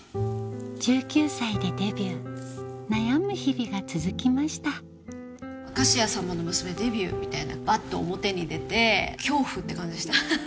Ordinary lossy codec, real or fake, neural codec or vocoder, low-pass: none; real; none; none